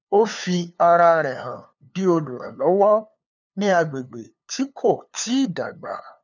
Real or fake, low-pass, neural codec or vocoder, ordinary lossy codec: fake; 7.2 kHz; codec, 16 kHz, 2 kbps, FunCodec, trained on LibriTTS, 25 frames a second; none